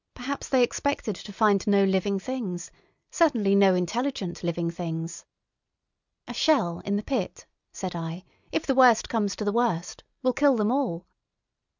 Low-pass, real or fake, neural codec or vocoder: 7.2 kHz; real; none